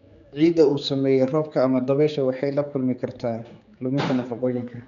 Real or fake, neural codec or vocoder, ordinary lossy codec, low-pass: fake; codec, 16 kHz, 4 kbps, X-Codec, HuBERT features, trained on general audio; none; 7.2 kHz